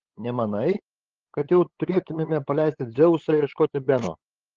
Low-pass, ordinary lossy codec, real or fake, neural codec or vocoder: 7.2 kHz; Opus, 16 kbps; fake; codec, 16 kHz, 8 kbps, FunCodec, trained on LibriTTS, 25 frames a second